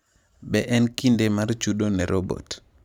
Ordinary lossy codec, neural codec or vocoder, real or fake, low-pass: none; vocoder, 44.1 kHz, 128 mel bands every 512 samples, BigVGAN v2; fake; 19.8 kHz